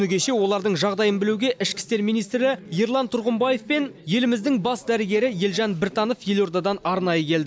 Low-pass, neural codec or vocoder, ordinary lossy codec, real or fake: none; none; none; real